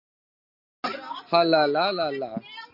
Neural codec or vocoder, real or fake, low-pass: none; real; 5.4 kHz